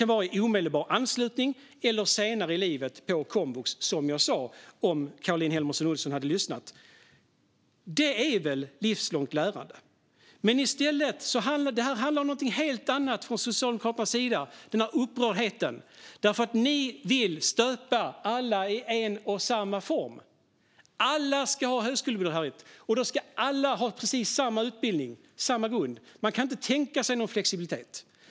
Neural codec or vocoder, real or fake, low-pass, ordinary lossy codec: none; real; none; none